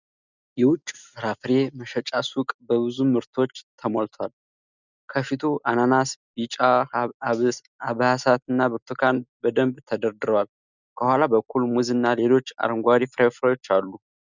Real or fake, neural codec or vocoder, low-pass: real; none; 7.2 kHz